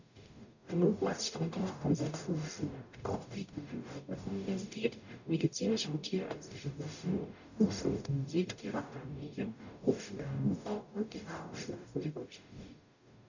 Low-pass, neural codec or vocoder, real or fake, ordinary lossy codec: 7.2 kHz; codec, 44.1 kHz, 0.9 kbps, DAC; fake; none